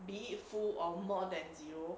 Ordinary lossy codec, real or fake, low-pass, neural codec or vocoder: none; real; none; none